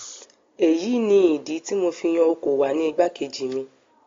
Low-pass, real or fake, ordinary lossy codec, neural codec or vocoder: 7.2 kHz; real; AAC, 32 kbps; none